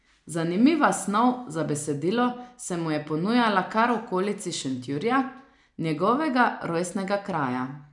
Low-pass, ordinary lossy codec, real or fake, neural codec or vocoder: 10.8 kHz; none; real; none